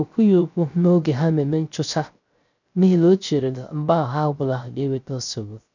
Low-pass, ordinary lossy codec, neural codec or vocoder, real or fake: 7.2 kHz; none; codec, 16 kHz, 0.3 kbps, FocalCodec; fake